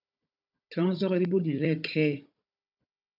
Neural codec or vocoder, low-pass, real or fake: codec, 16 kHz, 16 kbps, FunCodec, trained on Chinese and English, 50 frames a second; 5.4 kHz; fake